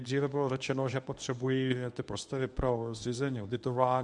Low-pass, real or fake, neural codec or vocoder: 10.8 kHz; fake; codec, 24 kHz, 0.9 kbps, WavTokenizer, medium speech release version 1